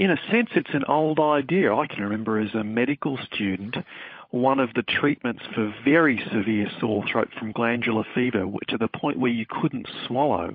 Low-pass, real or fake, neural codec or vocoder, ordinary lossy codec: 5.4 kHz; fake; codec, 16 kHz, 16 kbps, FunCodec, trained on LibriTTS, 50 frames a second; MP3, 32 kbps